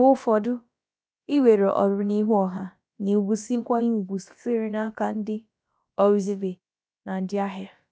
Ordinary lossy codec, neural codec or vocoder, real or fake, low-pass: none; codec, 16 kHz, about 1 kbps, DyCAST, with the encoder's durations; fake; none